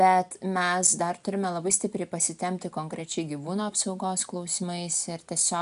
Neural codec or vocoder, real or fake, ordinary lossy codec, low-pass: none; real; AAC, 96 kbps; 10.8 kHz